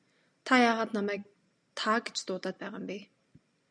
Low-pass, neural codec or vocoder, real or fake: 9.9 kHz; none; real